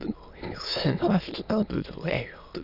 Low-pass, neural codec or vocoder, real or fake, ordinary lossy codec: 5.4 kHz; autoencoder, 22.05 kHz, a latent of 192 numbers a frame, VITS, trained on many speakers; fake; none